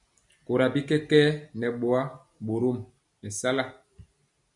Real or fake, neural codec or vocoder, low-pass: real; none; 10.8 kHz